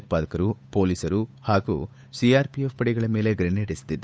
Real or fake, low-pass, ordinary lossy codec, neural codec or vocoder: fake; none; none; codec, 16 kHz, 8 kbps, FunCodec, trained on Chinese and English, 25 frames a second